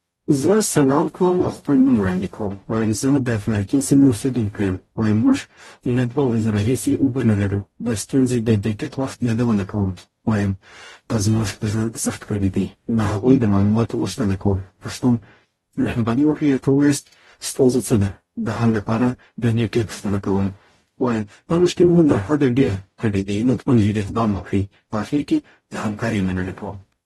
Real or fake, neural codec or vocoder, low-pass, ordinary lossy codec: fake; codec, 44.1 kHz, 0.9 kbps, DAC; 19.8 kHz; AAC, 32 kbps